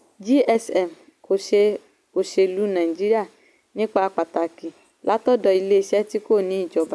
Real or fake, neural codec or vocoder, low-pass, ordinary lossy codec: real; none; none; none